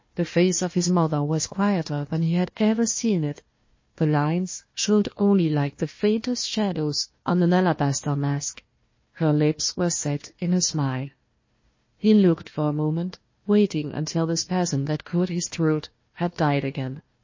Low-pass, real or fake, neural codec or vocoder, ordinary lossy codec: 7.2 kHz; fake; codec, 16 kHz, 1 kbps, FunCodec, trained on Chinese and English, 50 frames a second; MP3, 32 kbps